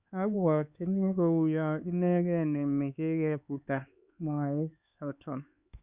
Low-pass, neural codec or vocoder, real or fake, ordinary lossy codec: 3.6 kHz; codec, 16 kHz, 4 kbps, X-Codec, HuBERT features, trained on LibriSpeech; fake; Opus, 64 kbps